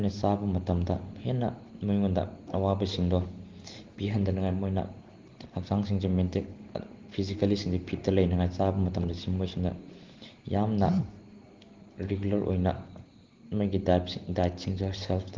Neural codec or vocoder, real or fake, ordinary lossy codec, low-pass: none; real; Opus, 16 kbps; 7.2 kHz